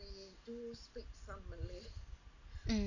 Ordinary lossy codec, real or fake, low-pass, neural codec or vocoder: none; real; 7.2 kHz; none